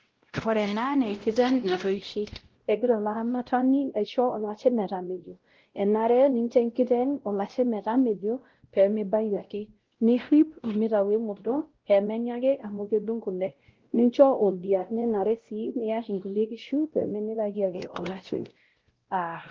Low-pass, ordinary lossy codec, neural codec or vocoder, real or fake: 7.2 kHz; Opus, 16 kbps; codec, 16 kHz, 0.5 kbps, X-Codec, WavLM features, trained on Multilingual LibriSpeech; fake